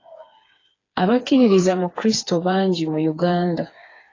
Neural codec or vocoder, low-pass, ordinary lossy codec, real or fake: codec, 16 kHz, 4 kbps, FreqCodec, smaller model; 7.2 kHz; AAC, 32 kbps; fake